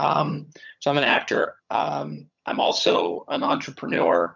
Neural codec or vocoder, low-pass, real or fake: vocoder, 22.05 kHz, 80 mel bands, HiFi-GAN; 7.2 kHz; fake